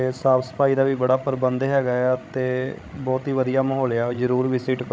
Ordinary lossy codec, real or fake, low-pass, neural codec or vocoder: none; fake; none; codec, 16 kHz, 16 kbps, FreqCodec, larger model